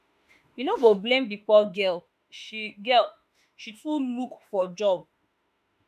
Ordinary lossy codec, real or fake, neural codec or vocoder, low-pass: none; fake; autoencoder, 48 kHz, 32 numbers a frame, DAC-VAE, trained on Japanese speech; 14.4 kHz